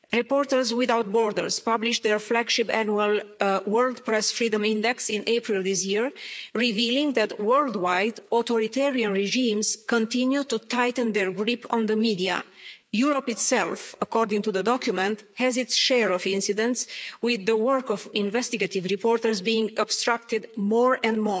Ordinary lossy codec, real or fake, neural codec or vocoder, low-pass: none; fake; codec, 16 kHz, 4 kbps, FreqCodec, larger model; none